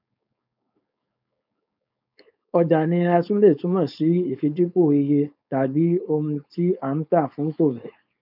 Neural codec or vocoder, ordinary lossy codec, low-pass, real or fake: codec, 16 kHz, 4.8 kbps, FACodec; none; 5.4 kHz; fake